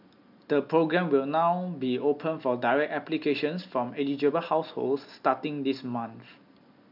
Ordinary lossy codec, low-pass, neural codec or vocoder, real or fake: none; 5.4 kHz; none; real